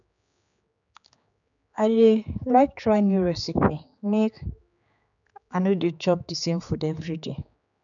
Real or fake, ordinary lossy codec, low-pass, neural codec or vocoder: fake; none; 7.2 kHz; codec, 16 kHz, 4 kbps, X-Codec, HuBERT features, trained on general audio